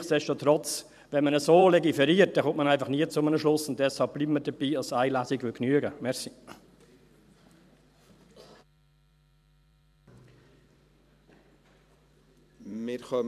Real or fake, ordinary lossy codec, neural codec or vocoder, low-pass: fake; none; vocoder, 44.1 kHz, 128 mel bands every 512 samples, BigVGAN v2; 14.4 kHz